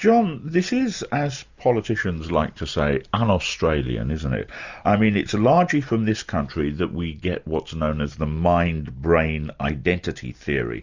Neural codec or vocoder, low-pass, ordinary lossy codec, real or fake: none; 7.2 kHz; Opus, 64 kbps; real